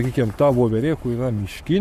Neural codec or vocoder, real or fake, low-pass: codec, 44.1 kHz, 7.8 kbps, Pupu-Codec; fake; 14.4 kHz